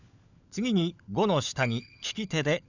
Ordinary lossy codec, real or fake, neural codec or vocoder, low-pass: none; fake; codec, 16 kHz, 16 kbps, FunCodec, trained on LibriTTS, 50 frames a second; 7.2 kHz